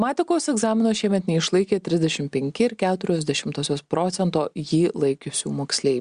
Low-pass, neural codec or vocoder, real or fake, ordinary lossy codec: 9.9 kHz; none; real; Opus, 64 kbps